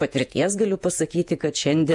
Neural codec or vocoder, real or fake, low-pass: vocoder, 48 kHz, 128 mel bands, Vocos; fake; 10.8 kHz